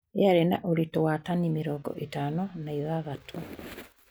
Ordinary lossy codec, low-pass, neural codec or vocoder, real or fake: none; none; none; real